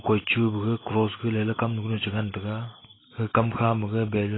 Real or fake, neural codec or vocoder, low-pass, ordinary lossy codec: real; none; 7.2 kHz; AAC, 16 kbps